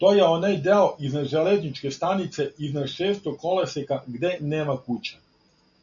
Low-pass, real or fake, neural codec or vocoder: 7.2 kHz; real; none